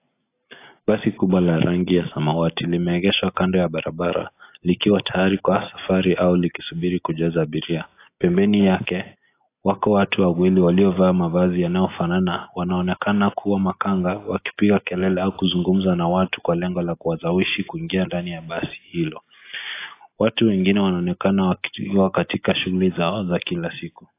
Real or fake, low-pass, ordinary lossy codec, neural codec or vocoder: real; 3.6 kHz; AAC, 24 kbps; none